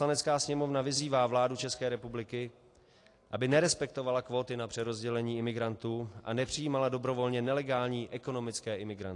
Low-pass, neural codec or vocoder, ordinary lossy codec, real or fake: 10.8 kHz; none; AAC, 48 kbps; real